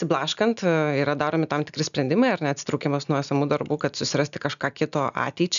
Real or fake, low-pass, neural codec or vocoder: real; 7.2 kHz; none